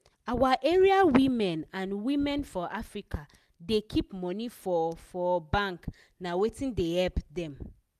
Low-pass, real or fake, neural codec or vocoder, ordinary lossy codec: 14.4 kHz; real; none; none